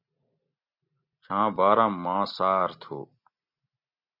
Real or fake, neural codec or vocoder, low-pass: real; none; 5.4 kHz